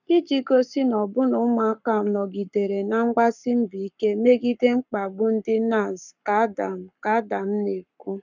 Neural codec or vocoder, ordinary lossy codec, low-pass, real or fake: codec, 44.1 kHz, 7.8 kbps, Pupu-Codec; none; 7.2 kHz; fake